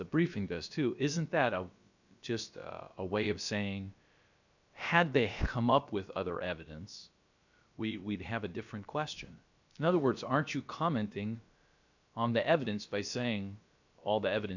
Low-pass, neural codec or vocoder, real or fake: 7.2 kHz; codec, 16 kHz, about 1 kbps, DyCAST, with the encoder's durations; fake